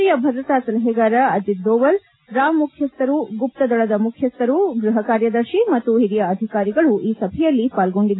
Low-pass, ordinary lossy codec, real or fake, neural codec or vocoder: 7.2 kHz; AAC, 16 kbps; real; none